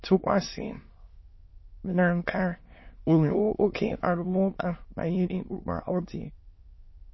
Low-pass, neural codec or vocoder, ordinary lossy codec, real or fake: 7.2 kHz; autoencoder, 22.05 kHz, a latent of 192 numbers a frame, VITS, trained on many speakers; MP3, 24 kbps; fake